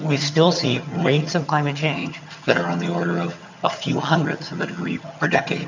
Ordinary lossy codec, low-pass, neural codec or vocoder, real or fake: MP3, 64 kbps; 7.2 kHz; vocoder, 22.05 kHz, 80 mel bands, HiFi-GAN; fake